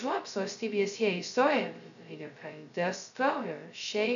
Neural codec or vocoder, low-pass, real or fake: codec, 16 kHz, 0.2 kbps, FocalCodec; 7.2 kHz; fake